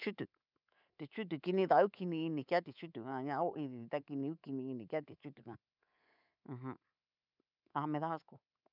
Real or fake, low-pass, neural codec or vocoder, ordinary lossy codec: fake; 5.4 kHz; codec, 24 kHz, 3.1 kbps, DualCodec; none